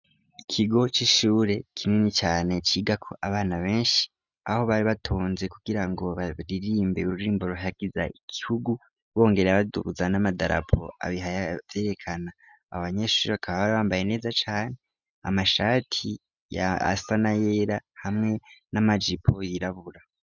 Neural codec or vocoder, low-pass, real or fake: none; 7.2 kHz; real